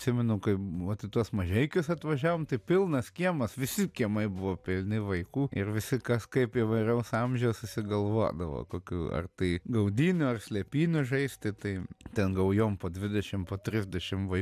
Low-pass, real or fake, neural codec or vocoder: 14.4 kHz; real; none